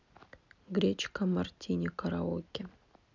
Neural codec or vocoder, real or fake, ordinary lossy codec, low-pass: none; real; none; 7.2 kHz